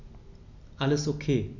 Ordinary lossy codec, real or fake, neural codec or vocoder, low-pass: none; real; none; 7.2 kHz